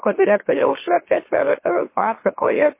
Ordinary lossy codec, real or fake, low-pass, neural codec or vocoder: MP3, 24 kbps; fake; 3.6 kHz; autoencoder, 44.1 kHz, a latent of 192 numbers a frame, MeloTTS